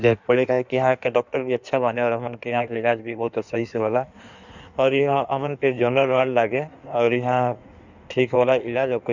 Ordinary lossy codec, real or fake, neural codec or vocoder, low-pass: none; fake; codec, 16 kHz in and 24 kHz out, 1.1 kbps, FireRedTTS-2 codec; 7.2 kHz